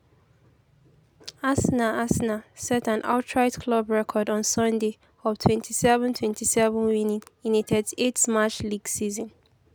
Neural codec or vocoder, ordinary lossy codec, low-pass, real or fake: none; none; none; real